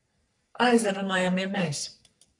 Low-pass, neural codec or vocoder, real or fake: 10.8 kHz; codec, 44.1 kHz, 3.4 kbps, Pupu-Codec; fake